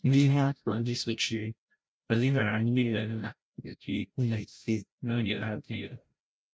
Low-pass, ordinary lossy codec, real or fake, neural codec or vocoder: none; none; fake; codec, 16 kHz, 0.5 kbps, FreqCodec, larger model